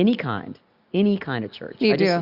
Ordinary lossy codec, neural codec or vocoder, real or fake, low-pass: Opus, 64 kbps; none; real; 5.4 kHz